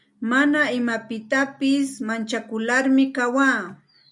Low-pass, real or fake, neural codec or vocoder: 10.8 kHz; real; none